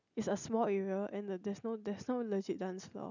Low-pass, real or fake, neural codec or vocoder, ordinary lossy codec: 7.2 kHz; real; none; none